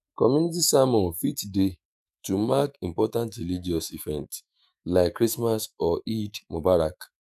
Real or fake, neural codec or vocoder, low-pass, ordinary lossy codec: fake; autoencoder, 48 kHz, 128 numbers a frame, DAC-VAE, trained on Japanese speech; 14.4 kHz; none